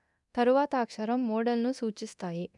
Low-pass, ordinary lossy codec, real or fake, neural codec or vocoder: 10.8 kHz; none; fake; codec, 24 kHz, 0.9 kbps, DualCodec